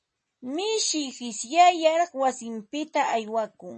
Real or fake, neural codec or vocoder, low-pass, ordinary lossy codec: real; none; 10.8 kHz; MP3, 32 kbps